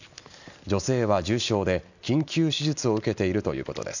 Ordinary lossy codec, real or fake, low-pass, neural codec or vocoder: none; real; 7.2 kHz; none